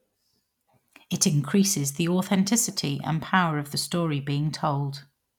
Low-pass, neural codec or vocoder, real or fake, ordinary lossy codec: 19.8 kHz; none; real; none